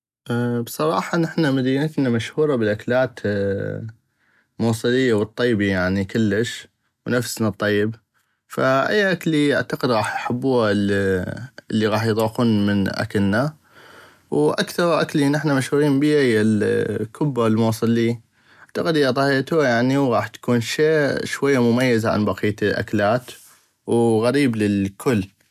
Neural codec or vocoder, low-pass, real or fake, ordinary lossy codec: none; 14.4 kHz; real; none